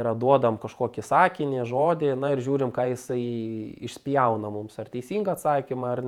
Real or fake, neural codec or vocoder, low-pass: fake; vocoder, 48 kHz, 128 mel bands, Vocos; 19.8 kHz